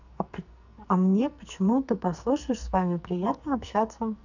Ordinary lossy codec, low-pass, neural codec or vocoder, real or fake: none; 7.2 kHz; codec, 44.1 kHz, 2.6 kbps, SNAC; fake